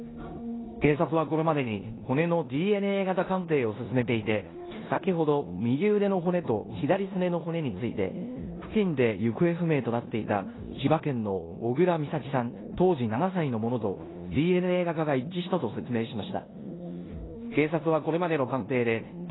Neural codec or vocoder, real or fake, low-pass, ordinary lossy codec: codec, 16 kHz in and 24 kHz out, 0.9 kbps, LongCat-Audio-Codec, four codebook decoder; fake; 7.2 kHz; AAC, 16 kbps